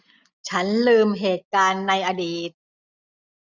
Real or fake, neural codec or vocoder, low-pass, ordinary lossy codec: real; none; 7.2 kHz; none